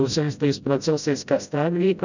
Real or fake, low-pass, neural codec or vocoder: fake; 7.2 kHz; codec, 16 kHz, 0.5 kbps, FreqCodec, smaller model